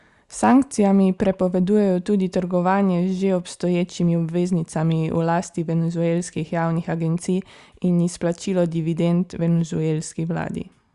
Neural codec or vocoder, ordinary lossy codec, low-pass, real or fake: none; Opus, 64 kbps; 10.8 kHz; real